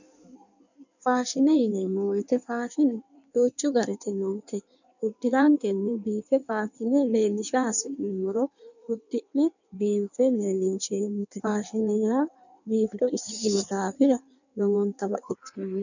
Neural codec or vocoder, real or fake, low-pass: codec, 16 kHz in and 24 kHz out, 1.1 kbps, FireRedTTS-2 codec; fake; 7.2 kHz